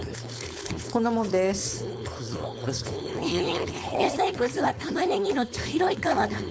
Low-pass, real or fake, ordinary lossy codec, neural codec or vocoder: none; fake; none; codec, 16 kHz, 4.8 kbps, FACodec